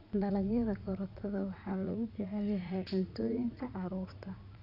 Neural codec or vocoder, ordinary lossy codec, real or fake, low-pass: vocoder, 44.1 kHz, 80 mel bands, Vocos; none; fake; 5.4 kHz